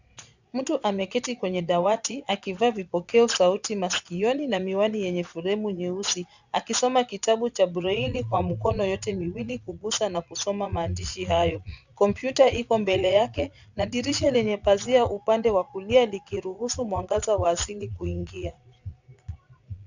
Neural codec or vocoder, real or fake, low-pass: vocoder, 22.05 kHz, 80 mel bands, Vocos; fake; 7.2 kHz